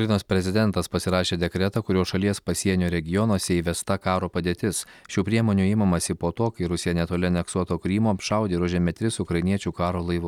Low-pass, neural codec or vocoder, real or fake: 19.8 kHz; none; real